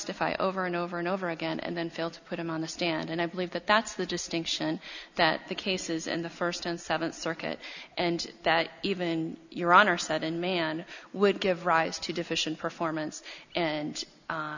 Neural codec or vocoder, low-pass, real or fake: none; 7.2 kHz; real